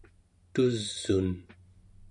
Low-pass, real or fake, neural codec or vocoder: 10.8 kHz; real; none